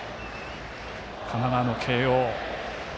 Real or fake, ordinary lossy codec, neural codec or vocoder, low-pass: real; none; none; none